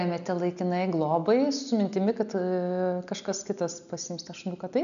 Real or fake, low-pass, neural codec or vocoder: real; 7.2 kHz; none